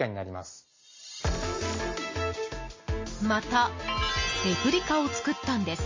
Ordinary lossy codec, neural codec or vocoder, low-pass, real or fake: MP3, 32 kbps; none; 7.2 kHz; real